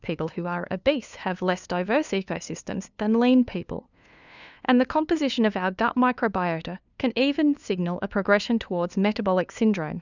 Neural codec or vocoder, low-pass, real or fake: codec, 16 kHz, 2 kbps, FunCodec, trained on LibriTTS, 25 frames a second; 7.2 kHz; fake